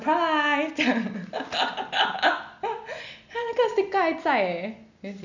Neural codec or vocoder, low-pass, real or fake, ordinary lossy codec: none; 7.2 kHz; real; none